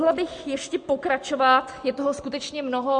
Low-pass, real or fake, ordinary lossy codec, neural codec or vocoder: 9.9 kHz; real; MP3, 48 kbps; none